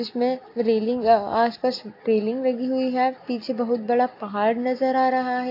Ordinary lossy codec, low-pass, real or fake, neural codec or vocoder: AAC, 32 kbps; 5.4 kHz; real; none